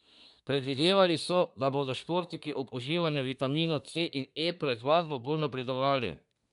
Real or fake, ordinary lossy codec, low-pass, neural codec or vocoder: fake; none; 10.8 kHz; codec, 24 kHz, 1 kbps, SNAC